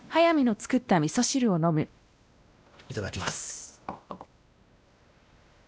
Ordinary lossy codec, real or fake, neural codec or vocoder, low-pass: none; fake; codec, 16 kHz, 1 kbps, X-Codec, WavLM features, trained on Multilingual LibriSpeech; none